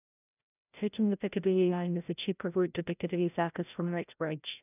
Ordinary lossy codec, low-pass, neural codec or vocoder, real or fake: none; 3.6 kHz; codec, 16 kHz, 0.5 kbps, FreqCodec, larger model; fake